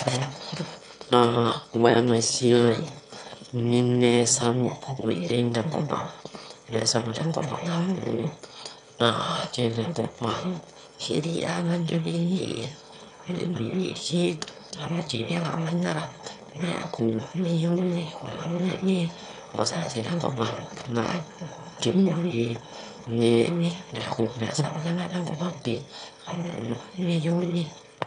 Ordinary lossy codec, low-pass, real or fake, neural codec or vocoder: none; 9.9 kHz; fake; autoencoder, 22.05 kHz, a latent of 192 numbers a frame, VITS, trained on one speaker